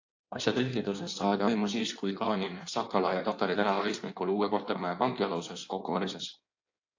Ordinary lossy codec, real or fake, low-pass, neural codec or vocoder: AAC, 48 kbps; fake; 7.2 kHz; codec, 16 kHz in and 24 kHz out, 1.1 kbps, FireRedTTS-2 codec